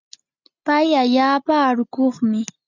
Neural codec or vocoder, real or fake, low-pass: none; real; 7.2 kHz